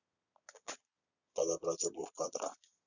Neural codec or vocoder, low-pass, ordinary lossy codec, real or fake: vocoder, 22.05 kHz, 80 mel bands, Vocos; 7.2 kHz; none; fake